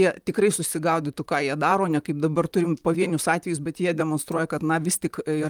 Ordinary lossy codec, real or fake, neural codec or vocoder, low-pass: Opus, 32 kbps; fake; vocoder, 44.1 kHz, 128 mel bands, Pupu-Vocoder; 19.8 kHz